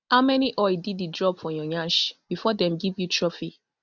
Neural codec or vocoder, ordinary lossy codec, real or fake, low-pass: none; none; real; 7.2 kHz